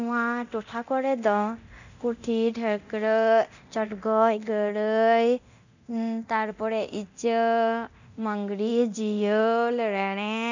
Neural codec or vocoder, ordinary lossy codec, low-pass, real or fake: codec, 24 kHz, 0.9 kbps, DualCodec; none; 7.2 kHz; fake